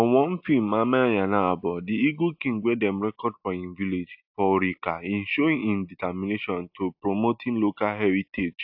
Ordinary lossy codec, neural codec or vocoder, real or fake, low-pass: AAC, 48 kbps; none; real; 5.4 kHz